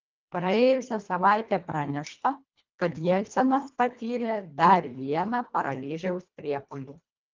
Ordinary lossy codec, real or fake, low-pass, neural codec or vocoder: Opus, 32 kbps; fake; 7.2 kHz; codec, 24 kHz, 1.5 kbps, HILCodec